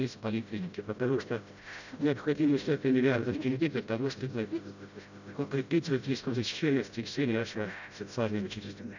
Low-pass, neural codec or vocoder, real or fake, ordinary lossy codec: 7.2 kHz; codec, 16 kHz, 0.5 kbps, FreqCodec, smaller model; fake; none